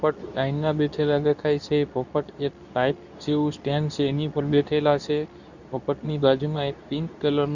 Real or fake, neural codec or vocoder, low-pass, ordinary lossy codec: fake; codec, 24 kHz, 0.9 kbps, WavTokenizer, medium speech release version 2; 7.2 kHz; none